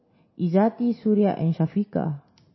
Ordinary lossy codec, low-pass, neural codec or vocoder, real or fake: MP3, 24 kbps; 7.2 kHz; none; real